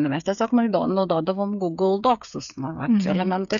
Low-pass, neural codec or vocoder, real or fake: 7.2 kHz; codec, 16 kHz, 8 kbps, FreqCodec, smaller model; fake